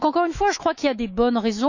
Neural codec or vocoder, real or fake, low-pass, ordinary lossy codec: autoencoder, 48 kHz, 128 numbers a frame, DAC-VAE, trained on Japanese speech; fake; 7.2 kHz; none